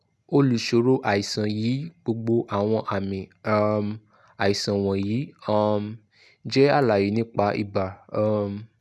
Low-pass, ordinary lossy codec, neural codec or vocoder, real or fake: none; none; none; real